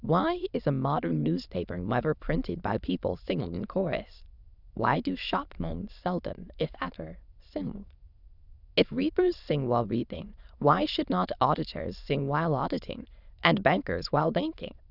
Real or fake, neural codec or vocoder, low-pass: fake; autoencoder, 22.05 kHz, a latent of 192 numbers a frame, VITS, trained on many speakers; 5.4 kHz